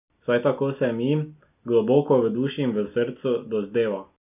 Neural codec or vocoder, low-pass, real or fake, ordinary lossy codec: none; 3.6 kHz; real; none